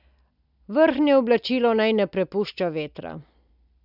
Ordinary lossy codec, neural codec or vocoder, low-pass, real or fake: none; none; 5.4 kHz; real